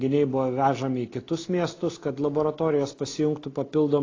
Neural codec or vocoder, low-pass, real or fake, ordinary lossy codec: none; 7.2 kHz; real; AAC, 32 kbps